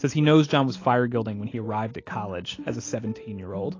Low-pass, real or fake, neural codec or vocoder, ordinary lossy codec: 7.2 kHz; real; none; AAC, 32 kbps